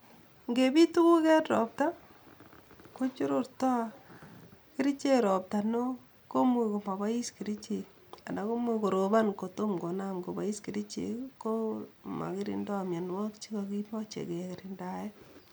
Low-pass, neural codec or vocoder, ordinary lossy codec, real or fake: none; none; none; real